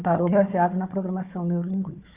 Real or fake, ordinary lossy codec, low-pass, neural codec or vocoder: fake; Opus, 64 kbps; 3.6 kHz; codec, 16 kHz, 4 kbps, FunCodec, trained on Chinese and English, 50 frames a second